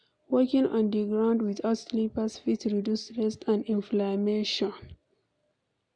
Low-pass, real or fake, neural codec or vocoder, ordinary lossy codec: 9.9 kHz; real; none; none